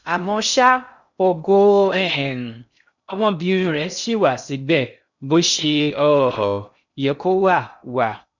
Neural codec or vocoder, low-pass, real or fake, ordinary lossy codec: codec, 16 kHz in and 24 kHz out, 0.6 kbps, FocalCodec, streaming, 2048 codes; 7.2 kHz; fake; none